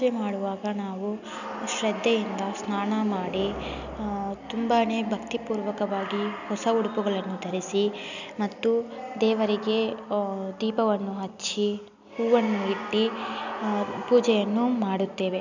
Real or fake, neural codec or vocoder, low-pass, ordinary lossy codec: real; none; 7.2 kHz; none